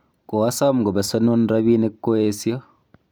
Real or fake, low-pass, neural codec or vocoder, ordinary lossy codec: real; none; none; none